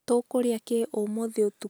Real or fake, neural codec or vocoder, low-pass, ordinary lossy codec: real; none; none; none